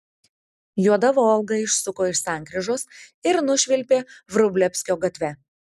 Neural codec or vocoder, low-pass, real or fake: none; 14.4 kHz; real